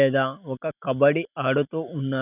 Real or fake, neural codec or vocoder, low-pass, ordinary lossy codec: real; none; 3.6 kHz; none